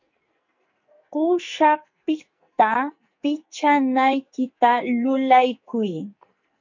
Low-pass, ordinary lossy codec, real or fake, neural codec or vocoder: 7.2 kHz; MP3, 48 kbps; fake; codec, 44.1 kHz, 2.6 kbps, SNAC